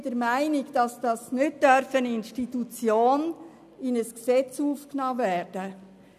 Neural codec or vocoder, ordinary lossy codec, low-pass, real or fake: none; none; 14.4 kHz; real